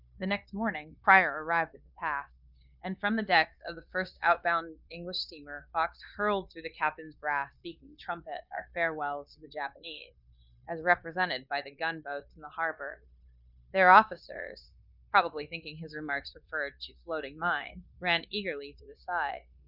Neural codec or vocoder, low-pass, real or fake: codec, 16 kHz, 0.9 kbps, LongCat-Audio-Codec; 5.4 kHz; fake